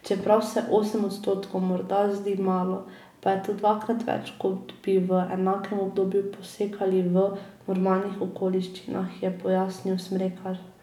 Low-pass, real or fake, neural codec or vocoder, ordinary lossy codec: 19.8 kHz; real; none; none